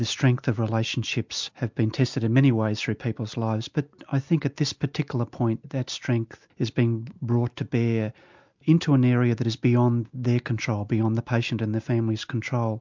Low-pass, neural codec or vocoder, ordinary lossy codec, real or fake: 7.2 kHz; none; MP3, 64 kbps; real